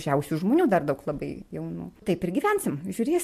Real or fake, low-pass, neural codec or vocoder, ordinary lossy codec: real; 14.4 kHz; none; MP3, 64 kbps